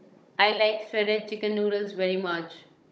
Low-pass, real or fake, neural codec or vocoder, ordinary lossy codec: none; fake; codec, 16 kHz, 16 kbps, FunCodec, trained on Chinese and English, 50 frames a second; none